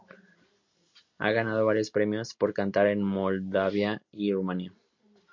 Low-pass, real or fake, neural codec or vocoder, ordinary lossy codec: 7.2 kHz; real; none; MP3, 48 kbps